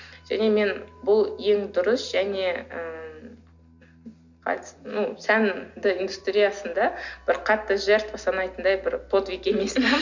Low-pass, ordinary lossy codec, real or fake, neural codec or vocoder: 7.2 kHz; none; real; none